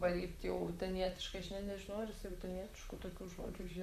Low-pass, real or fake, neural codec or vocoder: 14.4 kHz; fake; vocoder, 44.1 kHz, 128 mel bands, Pupu-Vocoder